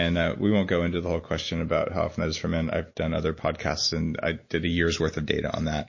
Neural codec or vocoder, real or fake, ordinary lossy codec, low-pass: none; real; MP3, 32 kbps; 7.2 kHz